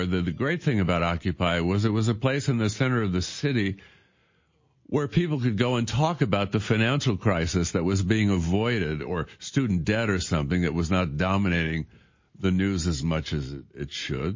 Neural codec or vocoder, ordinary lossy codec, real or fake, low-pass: none; MP3, 32 kbps; real; 7.2 kHz